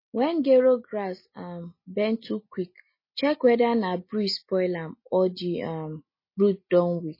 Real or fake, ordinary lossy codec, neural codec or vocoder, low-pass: real; MP3, 24 kbps; none; 5.4 kHz